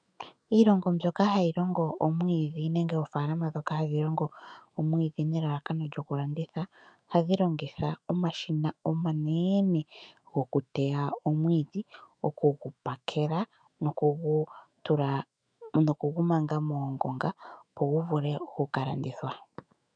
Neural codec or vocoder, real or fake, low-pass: autoencoder, 48 kHz, 128 numbers a frame, DAC-VAE, trained on Japanese speech; fake; 9.9 kHz